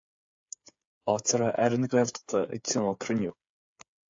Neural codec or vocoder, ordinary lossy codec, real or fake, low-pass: codec, 16 kHz, 8 kbps, FreqCodec, smaller model; AAC, 48 kbps; fake; 7.2 kHz